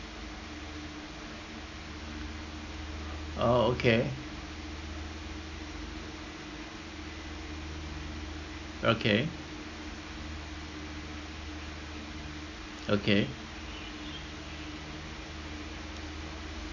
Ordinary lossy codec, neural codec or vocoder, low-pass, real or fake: none; none; 7.2 kHz; real